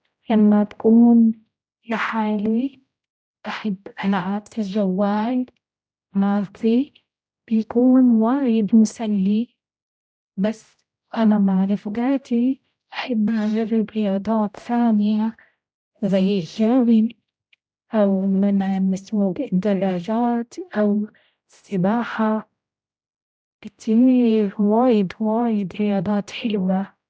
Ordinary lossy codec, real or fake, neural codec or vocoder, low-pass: none; fake; codec, 16 kHz, 0.5 kbps, X-Codec, HuBERT features, trained on general audio; none